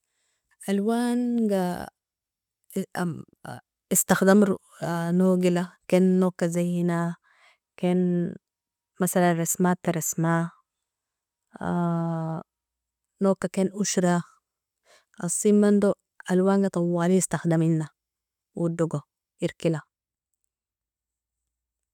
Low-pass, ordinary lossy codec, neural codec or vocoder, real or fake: 19.8 kHz; none; none; real